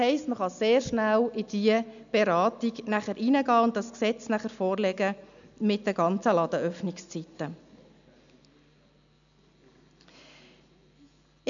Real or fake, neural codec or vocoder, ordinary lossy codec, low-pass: real; none; none; 7.2 kHz